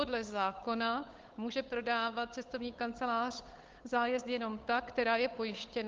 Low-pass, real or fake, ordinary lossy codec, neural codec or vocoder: 7.2 kHz; fake; Opus, 32 kbps; codec, 16 kHz, 8 kbps, FunCodec, trained on Chinese and English, 25 frames a second